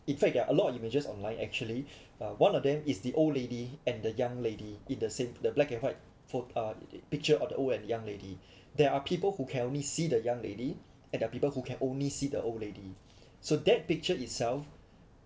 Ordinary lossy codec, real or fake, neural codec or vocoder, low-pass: none; real; none; none